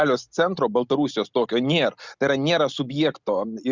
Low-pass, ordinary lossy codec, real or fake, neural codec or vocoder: 7.2 kHz; Opus, 64 kbps; real; none